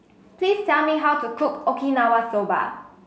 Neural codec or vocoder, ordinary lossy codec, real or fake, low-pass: none; none; real; none